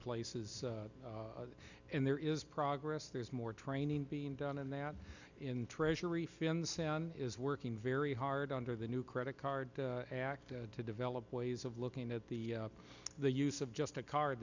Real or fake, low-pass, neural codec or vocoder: real; 7.2 kHz; none